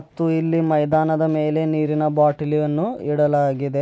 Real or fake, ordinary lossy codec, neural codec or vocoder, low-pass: real; none; none; none